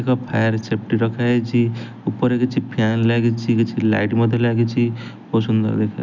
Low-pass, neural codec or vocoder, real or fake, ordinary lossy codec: 7.2 kHz; none; real; none